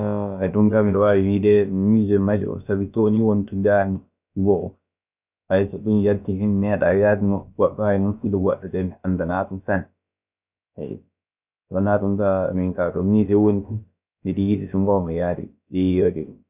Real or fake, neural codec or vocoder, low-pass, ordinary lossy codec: fake; codec, 16 kHz, about 1 kbps, DyCAST, with the encoder's durations; 3.6 kHz; none